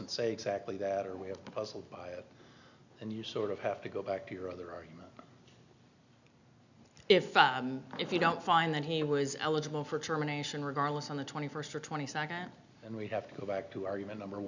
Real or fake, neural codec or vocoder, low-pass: real; none; 7.2 kHz